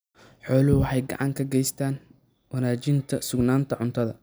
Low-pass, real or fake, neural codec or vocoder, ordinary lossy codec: none; real; none; none